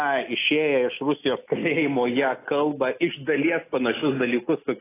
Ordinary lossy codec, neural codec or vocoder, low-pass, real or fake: AAC, 16 kbps; none; 3.6 kHz; real